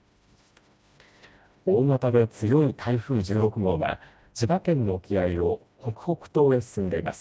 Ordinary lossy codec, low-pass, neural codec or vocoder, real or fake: none; none; codec, 16 kHz, 1 kbps, FreqCodec, smaller model; fake